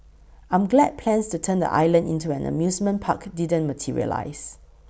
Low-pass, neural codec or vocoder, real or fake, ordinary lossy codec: none; none; real; none